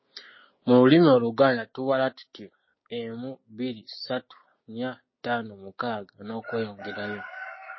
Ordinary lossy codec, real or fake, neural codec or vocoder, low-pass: MP3, 24 kbps; fake; codec, 16 kHz, 6 kbps, DAC; 7.2 kHz